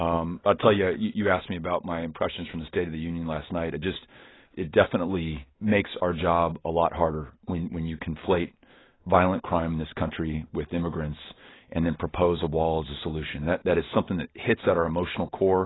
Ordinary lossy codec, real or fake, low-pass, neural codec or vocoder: AAC, 16 kbps; real; 7.2 kHz; none